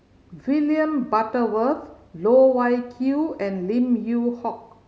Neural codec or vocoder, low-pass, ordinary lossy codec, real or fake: none; none; none; real